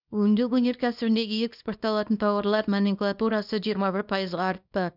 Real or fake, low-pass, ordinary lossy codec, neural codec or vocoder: fake; 5.4 kHz; none; codec, 24 kHz, 0.9 kbps, WavTokenizer, small release